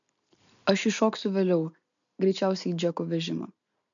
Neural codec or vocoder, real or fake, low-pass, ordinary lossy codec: none; real; 7.2 kHz; MP3, 96 kbps